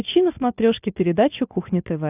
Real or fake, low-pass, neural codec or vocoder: real; 3.6 kHz; none